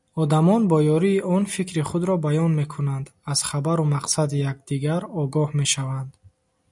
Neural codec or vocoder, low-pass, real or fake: none; 10.8 kHz; real